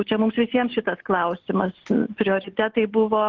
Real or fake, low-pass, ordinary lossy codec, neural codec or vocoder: real; 7.2 kHz; Opus, 16 kbps; none